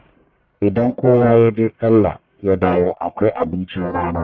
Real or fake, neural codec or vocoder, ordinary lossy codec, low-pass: fake; codec, 44.1 kHz, 1.7 kbps, Pupu-Codec; none; 7.2 kHz